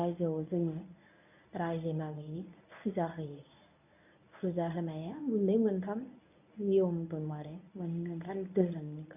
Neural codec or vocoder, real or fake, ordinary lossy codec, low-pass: codec, 24 kHz, 0.9 kbps, WavTokenizer, medium speech release version 1; fake; none; 3.6 kHz